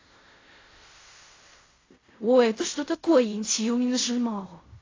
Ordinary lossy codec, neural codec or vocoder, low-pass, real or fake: AAC, 32 kbps; codec, 16 kHz in and 24 kHz out, 0.4 kbps, LongCat-Audio-Codec, fine tuned four codebook decoder; 7.2 kHz; fake